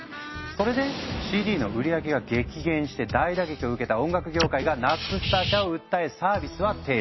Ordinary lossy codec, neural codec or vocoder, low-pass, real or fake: MP3, 24 kbps; none; 7.2 kHz; real